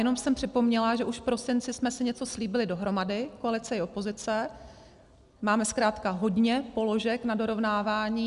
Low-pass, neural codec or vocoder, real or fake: 10.8 kHz; none; real